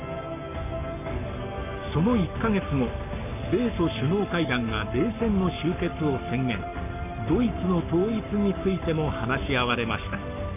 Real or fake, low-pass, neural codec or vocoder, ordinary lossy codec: fake; 3.6 kHz; codec, 44.1 kHz, 7.8 kbps, Pupu-Codec; none